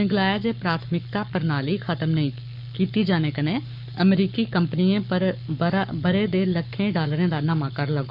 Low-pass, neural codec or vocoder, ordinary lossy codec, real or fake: 5.4 kHz; codec, 44.1 kHz, 7.8 kbps, Pupu-Codec; none; fake